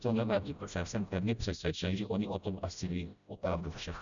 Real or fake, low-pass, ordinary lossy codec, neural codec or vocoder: fake; 7.2 kHz; MP3, 96 kbps; codec, 16 kHz, 0.5 kbps, FreqCodec, smaller model